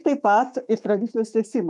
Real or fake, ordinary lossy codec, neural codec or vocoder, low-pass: fake; MP3, 96 kbps; autoencoder, 48 kHz, 128 numbers a frame, DAC-VAE, trained on Japanese speech; 10.8 kHz